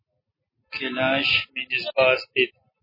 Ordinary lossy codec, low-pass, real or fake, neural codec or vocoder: MP3, 24 kbps; 5.4 kHz; real; none